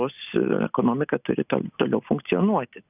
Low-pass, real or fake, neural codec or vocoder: 3.6 kHz; real; none